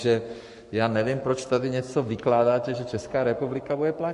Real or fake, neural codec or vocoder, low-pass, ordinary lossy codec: fake; codec, 44.1 kHz, 7.8 kbps, Pupu-Codec; 14.4 kHz; MP3, 48 kbps